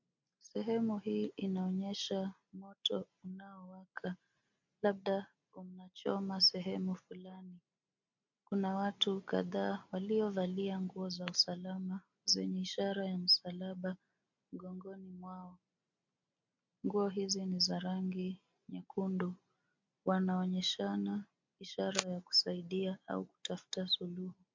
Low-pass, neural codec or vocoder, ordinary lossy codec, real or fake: 7.2 kHz; none; MP3, 48 kbps; real